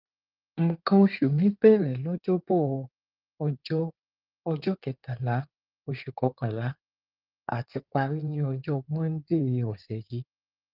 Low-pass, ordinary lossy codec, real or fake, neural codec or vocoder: 5.4 kHz; Opus, 32 kbps; fake; codec, 16 kHz in and 24 kHz out, 2.2 kbps, FireRedTTS-2 codec